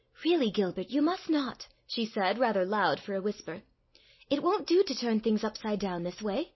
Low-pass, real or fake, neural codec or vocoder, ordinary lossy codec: 7.2 kHz; real; none; MP3, 24 kbps